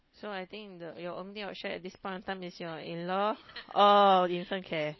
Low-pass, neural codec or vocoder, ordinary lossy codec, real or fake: 7.2 kHz; none; MP3, 24 kbps; real